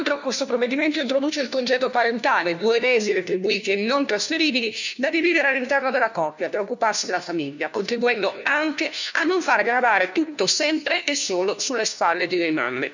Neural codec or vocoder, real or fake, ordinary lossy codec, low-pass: codec, 16 kHz, 1 kbps, FunCodec, trained on LibriTTS, 50 frames a second; fake; none; 7.2 kHz